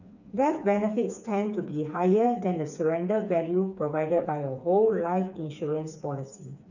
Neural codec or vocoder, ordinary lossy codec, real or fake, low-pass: codec, 16 kHz, 4 kbps, FreqCodec, smaller model; none; fake; 7.2 kHz